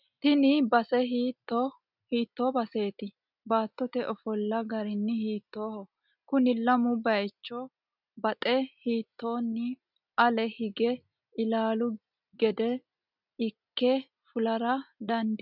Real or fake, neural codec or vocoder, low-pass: real; none; 5.4 kHz